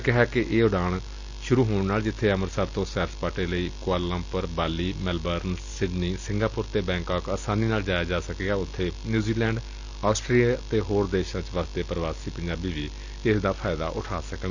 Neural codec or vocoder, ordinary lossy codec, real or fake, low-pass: none; Opus, 64 kbps; real; 7.2 kHz